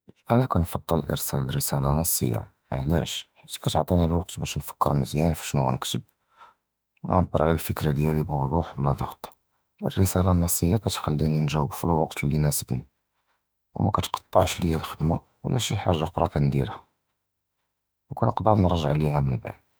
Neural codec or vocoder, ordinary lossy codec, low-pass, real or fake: autoencoder, 48 kHz, 32 numbers a frame, DAC-VAE, trained on Japanese speech; none; none; fake